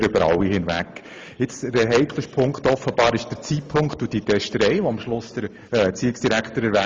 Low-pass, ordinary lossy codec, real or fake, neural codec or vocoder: 7.2 kHz; Opus, 32 kbps; real; none